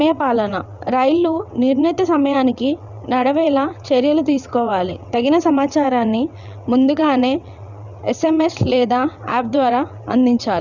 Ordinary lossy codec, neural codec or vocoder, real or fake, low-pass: none; vocoder, 22.05 kHz, 80 mel bands, WaveNeXt; fake; 7.2 kHz